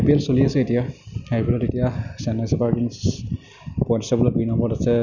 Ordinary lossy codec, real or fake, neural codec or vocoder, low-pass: none; real; none; 7.2 kHz